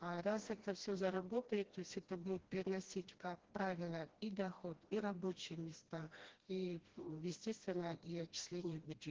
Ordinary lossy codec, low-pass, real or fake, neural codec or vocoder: Opus, 16 kbps; 7.2 kHz; fake; codec, 16 kHz, 1 kbps, FreqCodec, smaller model